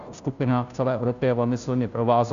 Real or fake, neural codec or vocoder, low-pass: fake; codec, 16 kHz, 0.5 kbps, FunCodec, trained on Chinese and English, 25 frames a second; 7.2 kHz